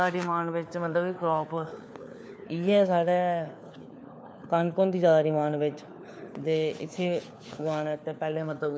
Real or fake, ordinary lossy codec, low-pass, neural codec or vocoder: fake; none; none; codec, 16 kHz, 4 kbps, FunCodec, trained on LibriTTS, 50 frames a second